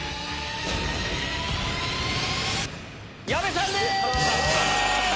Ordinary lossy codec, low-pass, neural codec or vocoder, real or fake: none; none; none; real